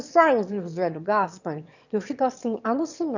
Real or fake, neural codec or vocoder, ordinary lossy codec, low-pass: fake; autoencoder, 22.05 kHz, a latent of 192 numbers a frame, VITS, trained on one speaker; none; 7.2 kHz